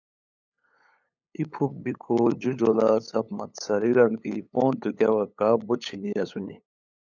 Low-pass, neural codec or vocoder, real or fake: 7.2 kHz; codec, 16 kHz, 8 kbps, FunCodec, trained on LibriTTS, 25 frames a second; fake